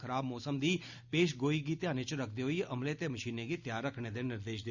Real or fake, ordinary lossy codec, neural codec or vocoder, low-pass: real; Opus, 64 kbps; none; 7.2 kHz